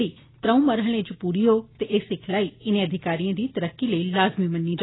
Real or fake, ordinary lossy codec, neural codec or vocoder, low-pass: real; AAC, 16 kbps; none; 7.2 kHz